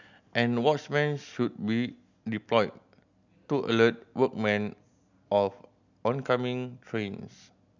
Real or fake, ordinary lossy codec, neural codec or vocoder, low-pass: real; none; none; 7.2 kHz